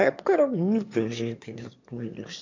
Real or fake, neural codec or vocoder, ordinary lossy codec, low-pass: fake; autoencoder, 22.05 kHz, a latent of 192 numbers a frame, VITS, trained on one speaker; MP3, 64 kbps; 7.2 kHz